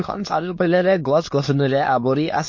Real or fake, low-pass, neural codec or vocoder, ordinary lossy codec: fake; 7.2 kHz; autoencoder, 22.05 kHz, a latent of 192 numbers a frame, VITS, trained on many speakers; MP3, 32 kbps